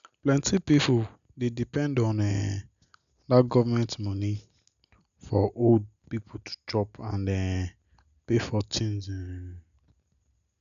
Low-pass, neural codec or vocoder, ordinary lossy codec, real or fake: 7.2 kHz; none; none; real